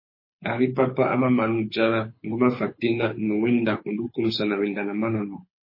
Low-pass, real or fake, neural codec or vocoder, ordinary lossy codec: 5.4 kHz; fake; codec, 24 kHz, 6 kbps, HILCodec; MP3, 24 kbps